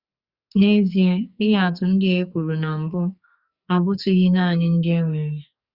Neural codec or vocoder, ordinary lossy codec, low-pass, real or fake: codec, 44.1 kHz, 2.6 kbps, SNAC; Opus, 64 kbps; 5.4 kHz; fake